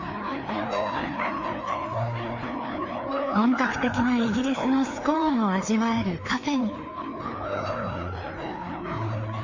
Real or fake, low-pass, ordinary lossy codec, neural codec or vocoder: fake; 7.2 kHz; MP3, 48 kbps; codec, 16 kHz, 2 kbps, FreqCodec, larger model